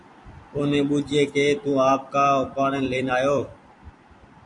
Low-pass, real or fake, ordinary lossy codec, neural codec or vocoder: 10.8 kHz; fake; MP3, 96 kbps; vocoder, 44.1 kHz, 128 mel bands every 256 samples, BigVGAN v2